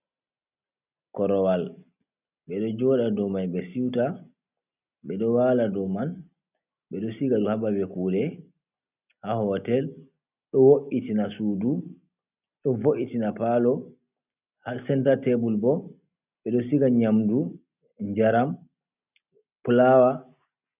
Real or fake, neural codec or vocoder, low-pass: real; none; 3.6 kHz